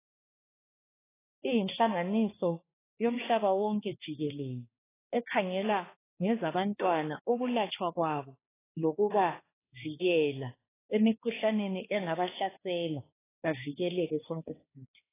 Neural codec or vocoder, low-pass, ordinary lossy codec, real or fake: codec, 16 kHz, 2 kbps, X-Codec, HuBERT features, trained on balanced general audio; 3.6 kHz; AAC, 16 kbps; fake